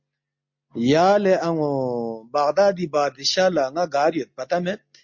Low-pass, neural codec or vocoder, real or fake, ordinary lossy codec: 7.2 kHz; none; real; MP3, 48 kbps